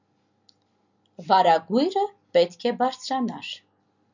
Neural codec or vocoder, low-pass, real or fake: none; 7.2 kHz; real